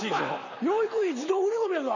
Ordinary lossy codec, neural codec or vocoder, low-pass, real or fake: none; vocoder, 44.1 kHz, 128 mel bands, Pupu-Vocoder; 7.2 kHz; fake